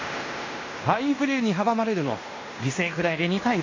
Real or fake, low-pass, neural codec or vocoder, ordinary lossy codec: fake; 7.2 kHz; codec, 16 kHz in and 24 kHz out, 0.9 kbps, LongCat-Audio-Codec, fine tuned four codebook decoder; AAC, 32 kbps